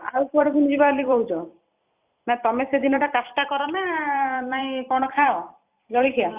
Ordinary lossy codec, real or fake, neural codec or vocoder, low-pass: Opus, 64 kbps; fake; vocoder, 44.1 kHz, 128 mel bands every 256 samples, BigVGAN v2; 3.6 kHz